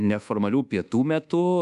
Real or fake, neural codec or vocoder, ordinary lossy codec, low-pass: fake; codec, 24 kHz, 1.2 kbps, DualCodec; MP3, 96 kbps; 10.8 kHz